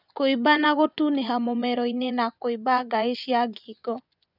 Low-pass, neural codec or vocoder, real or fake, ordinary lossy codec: 5.4 kHz; vocoder, 24 kHz, 100 mel bands, Vocos; fake; none